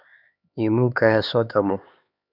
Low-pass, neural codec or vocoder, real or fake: 5.4 kHz; codec, 16 kHz, 4 kbps, X-Codec, HuBERT features, trained on LibriSpeech; fake